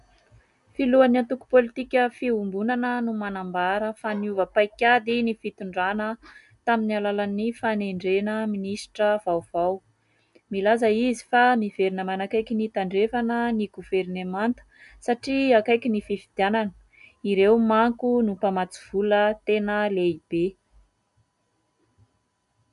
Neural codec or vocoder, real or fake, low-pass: none; real; 10.8 kHz